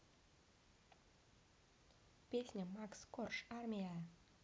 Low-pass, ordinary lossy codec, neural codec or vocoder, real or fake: none; none; none; real